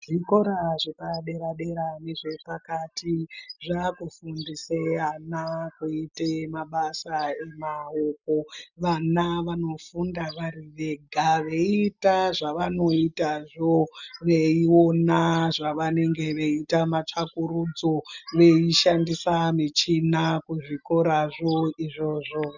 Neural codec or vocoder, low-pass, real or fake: none; 7.2 kHz; real